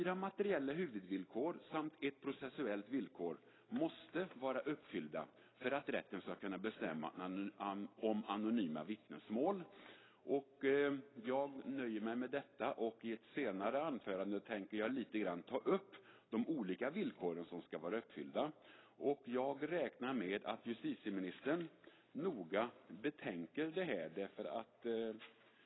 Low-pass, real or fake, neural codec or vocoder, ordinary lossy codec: 7.2 kHz; real; none; AAC, 16 kbps